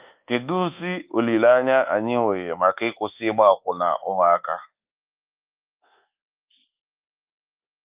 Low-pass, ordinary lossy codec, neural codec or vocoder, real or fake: 3.6 kHz; Opus, 32 kbps; codec, 24 kHz, 1.2 kbps, DualCodec; fake